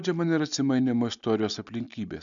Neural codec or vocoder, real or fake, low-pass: none; real; 7.2 kHz